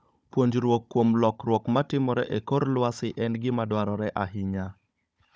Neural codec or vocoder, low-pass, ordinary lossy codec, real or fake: codec, 16 kHz, 16 kbps, FunCodec, trained on Chinese and English, 50 frames a second; none; none; fake